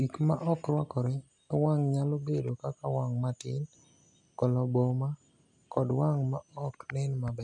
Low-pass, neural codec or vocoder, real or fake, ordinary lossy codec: 10.8 kHz; none; real; none